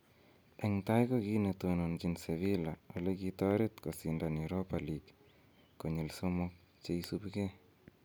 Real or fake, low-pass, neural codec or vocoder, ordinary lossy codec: real; none; none; none